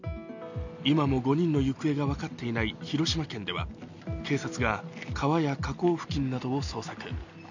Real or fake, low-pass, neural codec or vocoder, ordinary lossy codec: real; 7.2 kHz; none; none